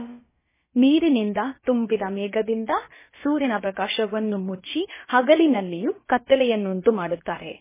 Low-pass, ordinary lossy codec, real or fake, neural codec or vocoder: 3.6 kHz; MP3, 16 kbps; fake; codec, 16 kHz, about 1 kbps, DyCAST, with the encoder's durations